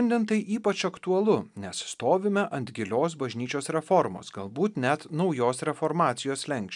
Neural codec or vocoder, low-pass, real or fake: none; 10.8 kHz; real